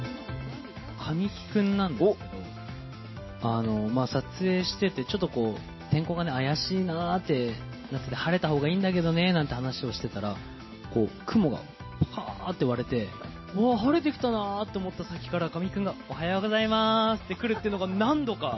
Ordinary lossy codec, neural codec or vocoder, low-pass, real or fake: MP3, 24 kbps; none; 7.2 kHz; real